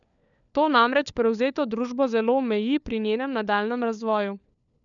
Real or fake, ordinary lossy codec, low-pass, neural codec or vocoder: fake; none; 7.2 kHz; codec, 16 kHz, 4 kbps, FunCodec, trained on LibriTTS, 50 frames a second